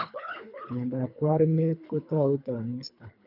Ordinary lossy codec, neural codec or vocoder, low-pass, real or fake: none; codec, 24 kHz, 3 kbps, HILCodec; 5.4 kHz; fake